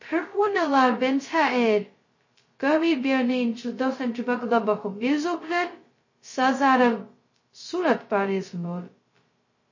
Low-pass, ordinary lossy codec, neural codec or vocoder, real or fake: 7.2 kHz; MP3, 32 kbps; codec, 16 kHz, 0.2 kbps, FocalCodec; fake